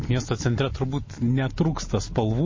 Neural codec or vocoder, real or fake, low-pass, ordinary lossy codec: none; real; 7.2 kHz; MP3, 32 kbps